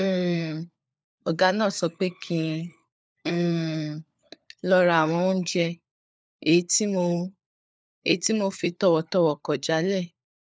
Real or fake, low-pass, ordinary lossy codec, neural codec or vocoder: fake; none; none; codec, 16 kHz, 4 kbps, FunCodec, trained on LibriTTS, 50 frames a second